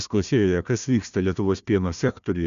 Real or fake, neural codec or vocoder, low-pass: fake; codec, 16 kHz, 1 kbps, FunCodec, trained on Chinese and English, 50 frames a second; 7.2 kHz